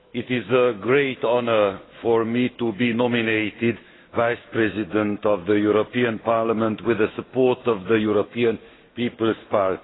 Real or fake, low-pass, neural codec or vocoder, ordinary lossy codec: fake; 7.2 kHz; autoencoder, 48 kHz, 128 numbers a frame, DAC-VAE, trained on Japanese speech; AAC, 16 kbps